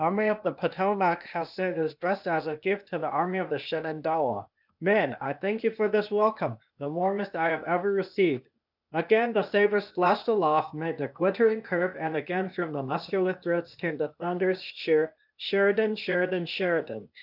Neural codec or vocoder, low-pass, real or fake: codec, 16 kHz, 0.8 kbps, ZipCodec; 5.4 kHz; fake